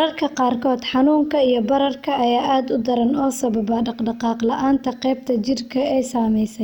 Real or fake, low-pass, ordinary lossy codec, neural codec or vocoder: real; 19.8 kHz; none; none